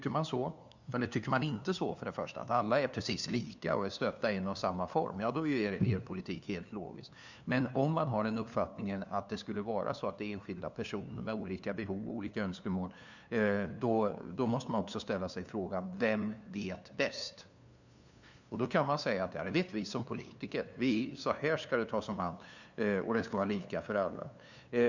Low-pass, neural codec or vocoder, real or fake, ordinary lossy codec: 7.2 kHz; codec, 16 kHz, 2 kbps, FunCodec, trained on LibriTTS, 25 frames a second; fake; none